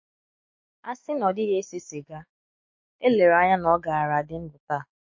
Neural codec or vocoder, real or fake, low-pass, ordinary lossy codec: autoencoder, 48 kHz, 128 numbers a frame, DAC-VAE, trained on Japanese speech; fake; 7.2 kHz; MP3, 32 kbps